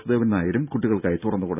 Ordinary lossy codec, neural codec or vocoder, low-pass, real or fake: none; none; 3.6 kHz; real